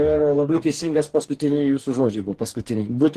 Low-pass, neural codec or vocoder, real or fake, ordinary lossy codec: 14.4 kHz; codec, 44.1 kHz, 2.6 kbps, DAC; fake; Opus, 16 kbps